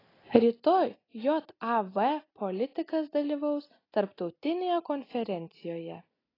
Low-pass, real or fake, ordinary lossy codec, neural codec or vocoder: 5.4 kHz; real; AAC, 24 kbps; none